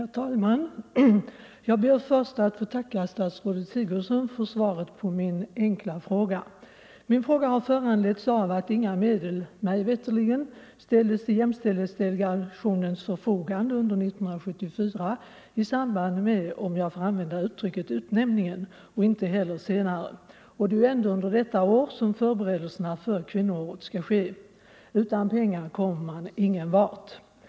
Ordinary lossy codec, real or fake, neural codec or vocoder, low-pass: none; real; none; none